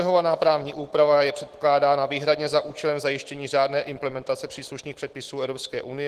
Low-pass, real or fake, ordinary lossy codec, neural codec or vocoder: 14.4 kHz; fake; Opus, 16 kbps; autoencoder, 48 kHz, 128 numbers a frame, DAC-VAE, trained on Japanese speech